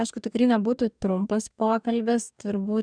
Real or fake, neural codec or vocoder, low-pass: fake; codec, 44.1 kHz, 2.6 kbps, DAC; 9.9 kHz